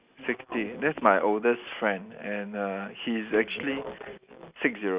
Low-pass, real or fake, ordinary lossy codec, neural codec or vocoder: 3.6 kHz; real; Opus, 64 kbps; none